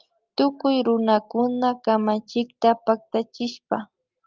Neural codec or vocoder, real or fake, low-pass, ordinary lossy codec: none; real; 7.2 kHz; Opus, 24 kbps